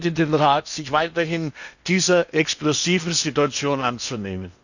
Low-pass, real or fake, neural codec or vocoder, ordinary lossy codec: 7.2 kHz; fake; codec, 16 kHz in and 24 kHz out, 0.8 kbps, FocalCodec, streaming, 65536 codes; none